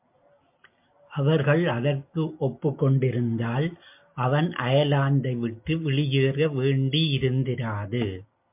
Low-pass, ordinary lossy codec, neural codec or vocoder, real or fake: 3.6 kHz; MP3, 32 kbps; none; real